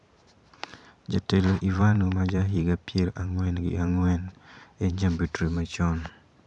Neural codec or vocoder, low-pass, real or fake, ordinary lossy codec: vocoder, 44.1 kHz, 128 mel bands every 512 samples, BigVGAN v2; 10.8 kHz; fake; none